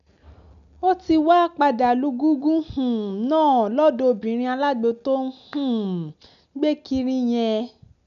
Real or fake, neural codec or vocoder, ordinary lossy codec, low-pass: real; none; none; 7.2 kHz